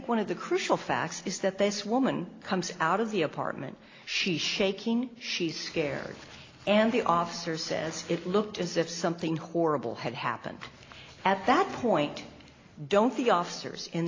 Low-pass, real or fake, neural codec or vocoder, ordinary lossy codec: 7.2 kHz; real; none; AAC, 32 kbps